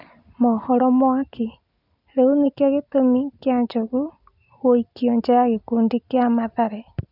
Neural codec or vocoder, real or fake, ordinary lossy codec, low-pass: none; real; none; 5.4 kHz